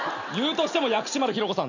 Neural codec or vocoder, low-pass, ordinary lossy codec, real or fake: none; 7.2 kHz; none; real